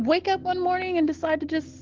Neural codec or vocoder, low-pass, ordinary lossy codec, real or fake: none; 7.2 kHz; Opus, 16 kbps; real